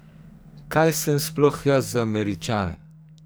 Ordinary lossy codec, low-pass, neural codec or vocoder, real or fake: none; none; codec, 44.1 kHz, 2.6 kbps, SNAC; fake